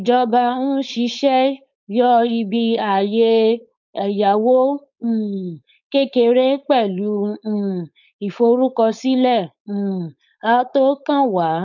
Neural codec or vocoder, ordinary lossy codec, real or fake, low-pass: codec, 16 kHz, 4.8 kbps, FACodec; none; fake; 7.2 kHz